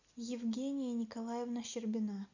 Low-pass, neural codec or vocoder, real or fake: 7.2 kHz; none; real